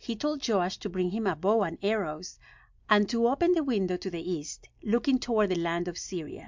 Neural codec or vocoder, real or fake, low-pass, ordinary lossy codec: none; real; 7.2 kHz; MP3, 64 kbps